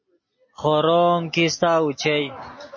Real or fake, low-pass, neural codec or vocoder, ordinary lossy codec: real; 7.2 kHz; none; MP3, 32 kbps